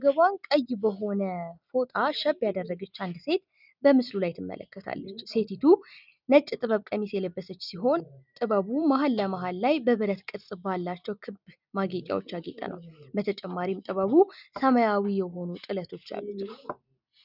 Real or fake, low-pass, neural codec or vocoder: real; 5.4 kHz; none